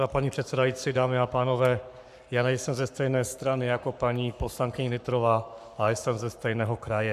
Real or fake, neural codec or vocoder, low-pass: fake; codec, 44.1 kHz, 7.8 kbps, Pupu-Codec; 14.4 kHz